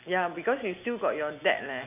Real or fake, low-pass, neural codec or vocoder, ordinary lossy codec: real; 3.6 kHz; none; none